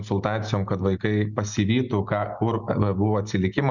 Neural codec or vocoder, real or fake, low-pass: none; real; 7.2 kHz